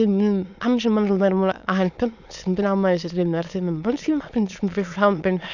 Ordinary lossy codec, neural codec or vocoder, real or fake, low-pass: none; autoencoder, 22.05 kHz, a latent of 192 numbers a frame, VITS, trained on many speakers; fake; 7.2 kHz